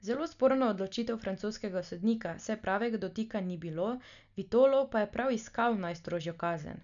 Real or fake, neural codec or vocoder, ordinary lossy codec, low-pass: real; none; none; 7.2 kHz